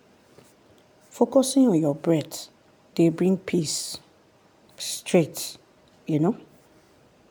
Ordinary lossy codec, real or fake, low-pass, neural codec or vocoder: none; real; none; none